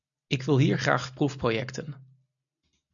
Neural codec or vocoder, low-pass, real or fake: none; 7.2 kHz; real